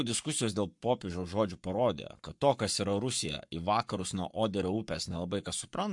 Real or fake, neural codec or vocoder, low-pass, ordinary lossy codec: fake; codec, 44.1 kHz, 7.8 kbps, Pupu-Codec; 10.8 kHz; MP3, 64 kbps